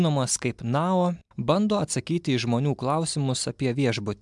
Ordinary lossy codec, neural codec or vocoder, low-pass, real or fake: MP3, 96 kbps; none; 10.8 kHz; real